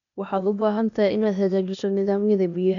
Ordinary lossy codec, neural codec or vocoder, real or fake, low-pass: MP3, 96 kbps; codec, 16 kHz, 0.8 kbps, ZipCodec; fake; 7.2 kHz